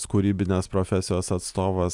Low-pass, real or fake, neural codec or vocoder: 10.8 kHz; real; none